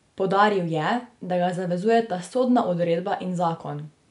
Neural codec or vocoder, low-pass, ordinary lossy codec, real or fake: none; 10.8 kHz; none; real